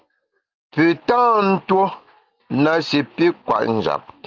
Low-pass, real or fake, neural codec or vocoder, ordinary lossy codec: 7.2 kHz; real; none; Opus, 24 kbps